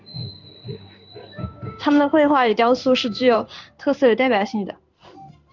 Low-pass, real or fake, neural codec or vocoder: 7.2 kHz; fake; codec, 16 kHz in and 24 kHz out, 1 kbps, XY-Tokenizer